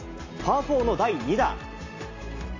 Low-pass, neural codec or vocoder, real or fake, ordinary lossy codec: 7.2 kHz; none; real; AAC, 32 kbps